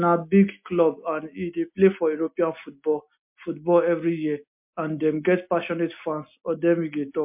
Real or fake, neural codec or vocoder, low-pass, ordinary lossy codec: real; none; 3.6 kHz; MP3, 32 kbps